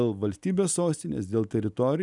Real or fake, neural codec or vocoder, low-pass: real; none; 10.8 kHz